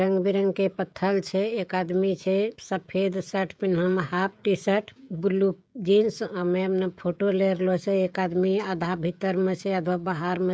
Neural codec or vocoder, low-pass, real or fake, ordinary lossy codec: codec, 16 kHz, 16 kbps, FreqCodec, smaller model; none; fake; none